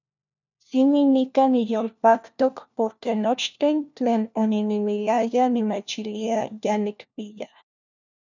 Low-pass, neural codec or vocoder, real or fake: 7.2 kHz; codec, 16 kHz, 1 kbps, FunCodec, trained on LibriTTS, 50 frames a second; fake